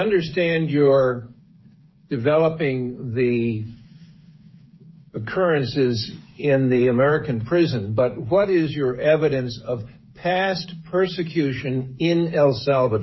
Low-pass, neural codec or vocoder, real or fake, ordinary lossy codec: 7.2 kHz; codec, 16 kHz, 8 kbps, FreqCodec, smaller model; fake; MP3, 24 kbps